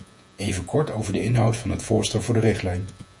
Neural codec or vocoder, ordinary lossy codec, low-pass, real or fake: vocoder, 48 kHz, 128 mel bands, Vocos; Opus, 64 kbps; 10.8 kHz; fake